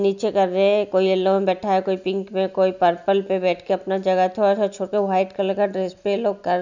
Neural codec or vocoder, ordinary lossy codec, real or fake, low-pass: none; none; real; 7.2 kHz